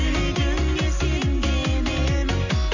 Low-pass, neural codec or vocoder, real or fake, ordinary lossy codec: 7.2 kHz; none; real; none